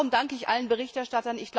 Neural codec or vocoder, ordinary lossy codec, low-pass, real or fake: none; none; none; real